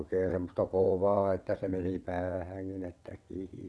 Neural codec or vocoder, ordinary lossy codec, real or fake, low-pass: vocoder, 22.05 kHz, 80 mel bands, WaveNeXt; none; fake; none